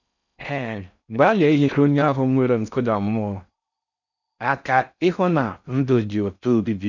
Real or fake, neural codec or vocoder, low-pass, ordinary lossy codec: fake; codec, 16 kHz in and 24 kHz out, 0.6 kbps, FocalCodec, streaming, 4096 codes; 7.2 kHz; none